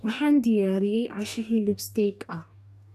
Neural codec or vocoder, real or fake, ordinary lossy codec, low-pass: codec, 44.1 kHz, 2.6 kbps, DAC; fake; none; 14.4 kHz